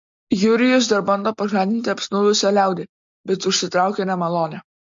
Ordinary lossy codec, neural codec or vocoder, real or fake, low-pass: MP3, 48 kbps; none; real; 7.2 kHz